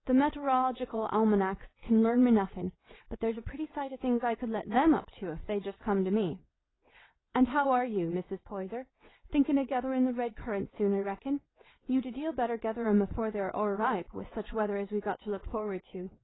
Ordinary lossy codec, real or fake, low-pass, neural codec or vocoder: AAC, 16 kbps; fake; 7.2 kHz; vocoder, 22.05 kHz, 80 mel bands, WaveNeXt